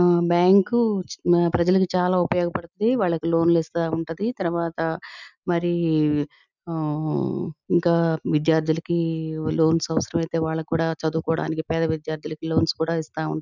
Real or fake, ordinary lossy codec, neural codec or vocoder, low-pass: real; none; none; 7.2 kHz